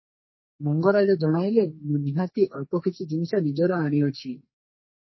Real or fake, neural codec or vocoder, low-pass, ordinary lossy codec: fake; codec, 44.1 kHz, 2.6 kbps, SNAC; 7.2 kHz; MP3, 24 kbps